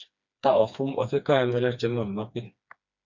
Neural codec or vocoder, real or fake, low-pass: codec, 16 kHz, 2 kbps, FreqCodec, smaller model; fake; 7.2 kHz